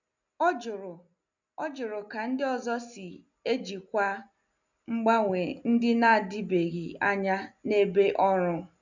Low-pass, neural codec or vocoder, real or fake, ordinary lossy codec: 7.2 kHz; none; real; none